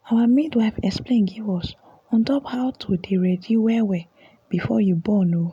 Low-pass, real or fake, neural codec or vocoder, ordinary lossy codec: 19.8 kHz; real; none; none